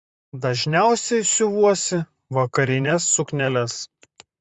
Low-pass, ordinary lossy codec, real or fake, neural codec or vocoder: 10.8 kHz; Opus, 64 kbps; fake; vocoder, 44.1 kHz, 128 mel bands, Pupu-Vocoder